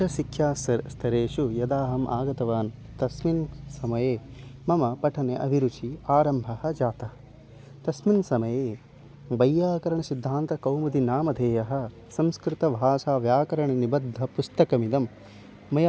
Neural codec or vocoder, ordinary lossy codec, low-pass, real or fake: none; none; none; real